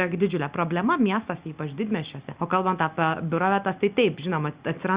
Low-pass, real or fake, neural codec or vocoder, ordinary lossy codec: 3.6 kHz; real; none; Opus, 64 kbps